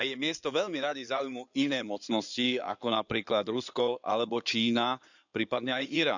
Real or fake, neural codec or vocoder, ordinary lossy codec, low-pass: fake; codec, 16 kHz in and 24 kHz out, 2.2 kbps, FireRedTTS-2 codec; none; 7.2 kHz